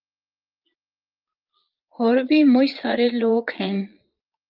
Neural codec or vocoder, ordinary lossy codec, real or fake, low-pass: vocoder, 22.05 kHz, 80 mel bands, Vocos; Opus, 24 kbps; fake; 5.4 kHz